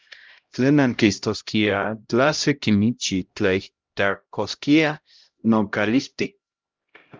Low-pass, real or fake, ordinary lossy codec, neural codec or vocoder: 7.2 kHz; fake; Opus, 24 kbps; codec, 16 kHz, 0.5 kbps, X-Codec, HuBERT features, trained on LibriSpeech